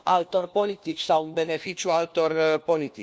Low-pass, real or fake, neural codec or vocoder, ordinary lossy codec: none; fake; codec, 16 kHz, 1 kbps, FunCodec, trained on LibriTTS, 50 frames a second; none